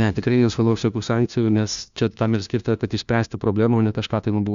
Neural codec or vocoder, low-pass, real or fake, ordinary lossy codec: codec, 16 kHz, 1 kbps, FunCodec, trained on LibriTTS, 50 frames a second; 7.2 kHz; fake; Opus, 64 kbps